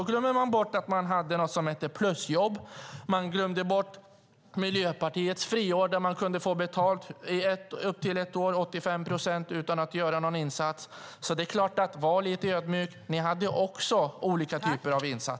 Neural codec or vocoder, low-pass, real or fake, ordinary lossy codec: none; none; real; none